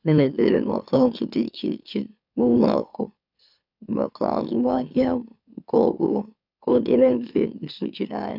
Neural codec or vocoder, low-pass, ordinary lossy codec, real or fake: autoencoder, 44.1 kHz, a latent of 192 numbers a frame, MeloTTS; 5.4 kHz; none; fake